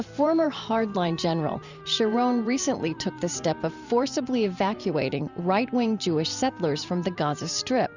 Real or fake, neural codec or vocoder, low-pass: fake; vocoder, 44.1 kHz, 128 mel bands every 512 samples, BigVGAN v2; 7.2 kHz